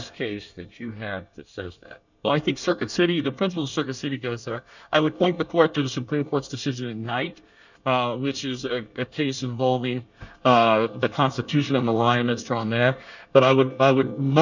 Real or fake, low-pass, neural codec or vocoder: fake; 7.2 kHz; codec, 24 kHz, 1 kbps, SNAC